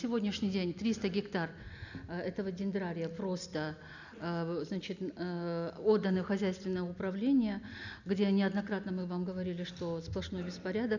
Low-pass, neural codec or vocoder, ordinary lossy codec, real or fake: 7.2 kHz; none; none; real